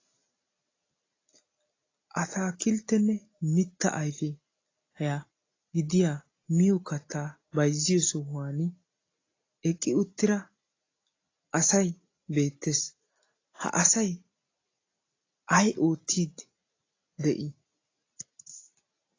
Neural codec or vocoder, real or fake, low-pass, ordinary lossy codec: none; real; 7.2 kHz; AAC, 32 kbps